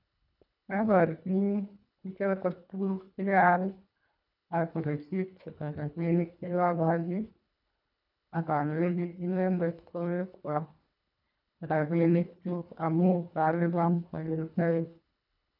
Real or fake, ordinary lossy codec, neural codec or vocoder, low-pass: fake; none; codec, 24 kHz, 1.5 kbps, HILCodec; 5.4 kHz